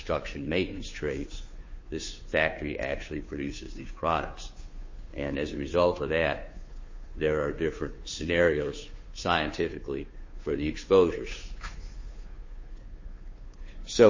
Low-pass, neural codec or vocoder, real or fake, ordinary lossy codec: 7.2 kHz; codec, 16 kHz, 2 kbps, FunCodec, trained on Chinese and English, 25 frames a second; fake; MP3, 32 kbps